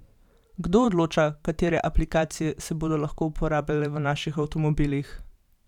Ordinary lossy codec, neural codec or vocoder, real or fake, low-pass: none; vocoder, 44.1 kHz, 128 mel bands every 512 samples, BigVGAN v2; fake; 19.8 kHz